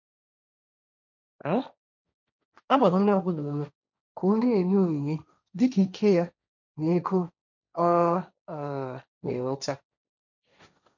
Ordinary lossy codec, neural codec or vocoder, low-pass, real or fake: none; codec, 16 kHz, 1.1 kbps, Voila-Tokenizer; 7.2 kHz; fake